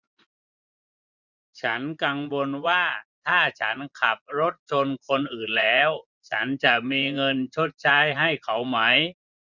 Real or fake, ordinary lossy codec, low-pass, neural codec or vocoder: fake; none; 7.2 kHz; vocoder, 24 kHz, 100 mel bands, Vocos